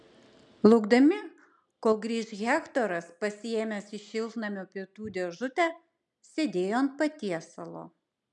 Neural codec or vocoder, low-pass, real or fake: none; 10.8 kHz; real